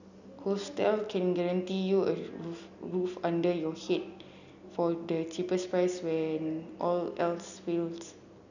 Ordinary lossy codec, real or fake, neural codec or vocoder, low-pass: none; real; none; 7.2 kHz